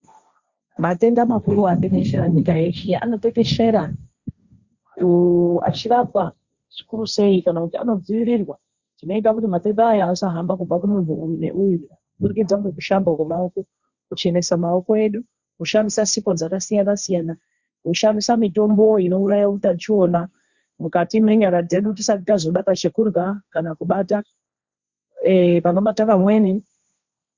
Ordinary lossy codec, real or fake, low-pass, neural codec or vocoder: Opus, 64 kbps; fake; 7.2 kHz; codec, 16 kHz, 1.1 kbps, Voila-Tokenizer